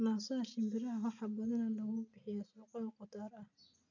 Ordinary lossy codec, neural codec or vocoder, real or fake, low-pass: none; none; real; 7.2 kHz